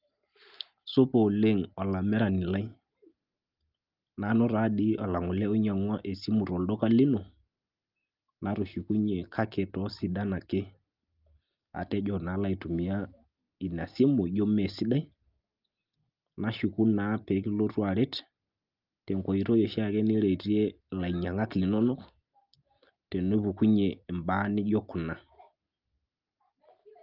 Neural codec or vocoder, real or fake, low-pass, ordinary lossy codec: none; real; 5.4 kHz; Opus, 32 kbps